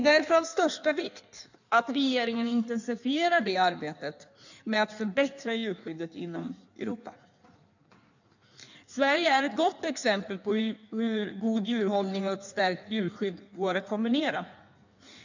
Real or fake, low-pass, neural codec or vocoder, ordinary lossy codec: fake; 7.2 kHz; codec, 16 kHz in and 24 kHz out, 1.1 kbps, FireRedTTS-2 codec; none